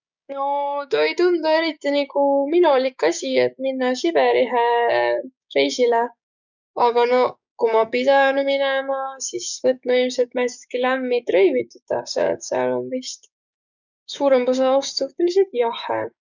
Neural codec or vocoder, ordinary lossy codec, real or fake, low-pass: codec, 16 kHz, 6 kbps, DAC; none; fake; 7.2 kHz